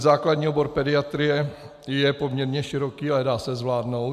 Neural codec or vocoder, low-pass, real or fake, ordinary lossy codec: none; 14.4 kHz; real; MP3, 96 kbps